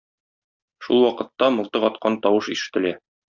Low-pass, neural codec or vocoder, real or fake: 7.2 kHz; none; real